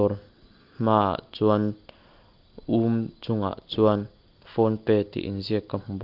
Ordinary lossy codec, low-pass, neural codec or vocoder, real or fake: Opus, 32 kbps; 5.4 kHz; none; real